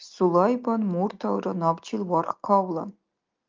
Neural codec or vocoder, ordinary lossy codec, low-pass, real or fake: none; Opus, 24 kbps; 7.2 kHz; real